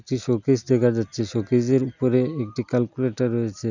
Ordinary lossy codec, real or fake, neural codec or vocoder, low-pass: none; real; none; 7.2 kHz